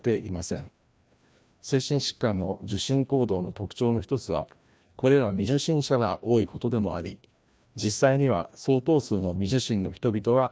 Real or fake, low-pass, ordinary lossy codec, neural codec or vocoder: fake; none; none; codec, 16 kHz, 1 kbps, FreqCodec, larger model